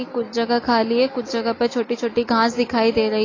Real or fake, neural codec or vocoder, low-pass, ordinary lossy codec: real; none; 7.2 kHz; AAC, 32 kbps